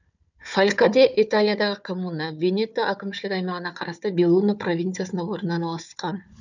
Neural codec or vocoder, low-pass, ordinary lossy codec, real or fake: codec, 16 kHz, 4 kbps, FunCodec, trained on Chinese and English, 50 frames a second; 7.2 kHz; none; fake